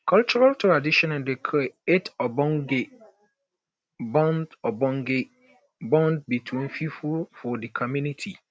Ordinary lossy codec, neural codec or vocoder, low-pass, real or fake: none; none; none; real